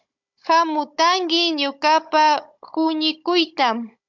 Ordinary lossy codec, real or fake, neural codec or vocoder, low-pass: MP3, 64 kbps; fake; codec, 16 kHz, 16 kbps, FunCodec, trained on Chinese and English, 50 frames a second; 7.2 kHz